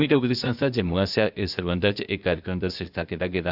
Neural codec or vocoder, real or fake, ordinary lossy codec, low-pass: codec, 16 kHz, 0.8 kbps, ZipCodec; fake; none; 5.4 kHz